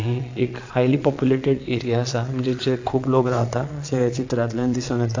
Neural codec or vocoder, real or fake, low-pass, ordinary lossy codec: vocoder, 22.05 kHz, 80 mel bands, Vocos; fake; 7.2 kHz; AAC, 48 kbps